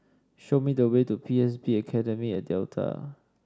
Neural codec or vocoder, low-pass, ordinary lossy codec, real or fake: none; none; none; real